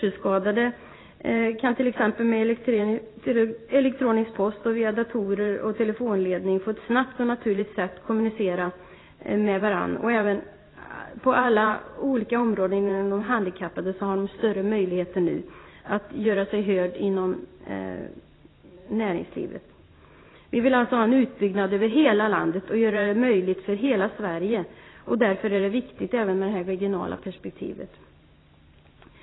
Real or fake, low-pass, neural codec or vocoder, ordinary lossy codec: fake; 7.2 kHz; vocoder, 44.1 kHz, 128 mel bands every 512 samples, BigVGAN v2; AAC, 16 kbps